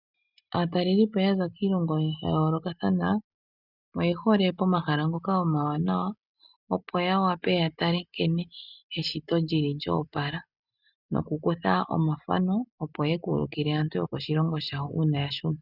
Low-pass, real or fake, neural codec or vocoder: 5.4 kHz; real; none